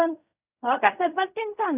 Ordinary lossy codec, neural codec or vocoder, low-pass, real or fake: none; codec, 16 kHz in and 24 kHz out, 0.4 kbps, LongCat-Audio-Codec, fine tuned four codebook decoder; 3.6 kHz; fake